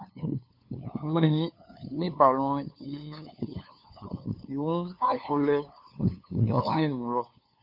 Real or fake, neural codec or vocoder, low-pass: fake; codec, 16 kHz, 2 kbps, FunCodec, trained on LibriTTS, 25 frames a second; 5.4 kHz